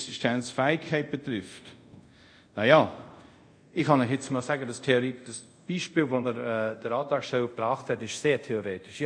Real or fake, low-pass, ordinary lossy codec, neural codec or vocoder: fake; 9.9 kHz; none; codec, 24 kHz, 0.5 kbps, DualCodec